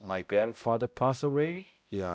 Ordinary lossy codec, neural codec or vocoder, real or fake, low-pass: none; codec, 16 kHz, 0.5 kbps, X-Codec, HuBERT features, trained on balanced general audio; fake; none